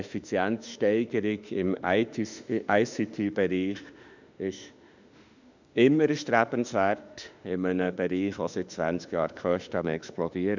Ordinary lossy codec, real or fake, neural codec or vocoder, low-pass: none; fake; autoencoder, 48 kHz, 32 numbers a frame, DAC-VAE, trained on Japanese speech; 7.2 kHz